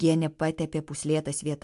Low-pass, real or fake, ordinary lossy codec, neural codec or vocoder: 10.8 kHz; real; MP3, 64 kbps; none